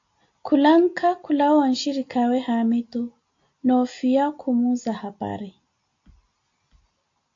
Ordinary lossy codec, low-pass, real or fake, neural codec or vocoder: AAC, 48 kbps; 7.2 kHz; real; none